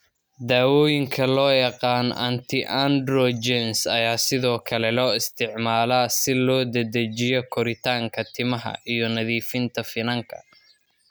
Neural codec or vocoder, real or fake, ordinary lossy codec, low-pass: none; real; none; none